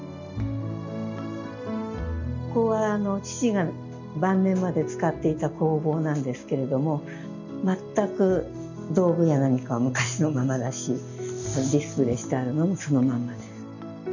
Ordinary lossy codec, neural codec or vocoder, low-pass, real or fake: none; none; 7.2 kHz; real